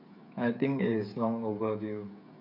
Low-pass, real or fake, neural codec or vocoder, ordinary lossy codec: 5.4 kHz; fake; codec, 16 kHz, 8 kbps, FreqCodec, smaller model; none